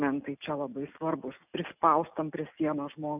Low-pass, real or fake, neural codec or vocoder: 3.6 kHz; real; none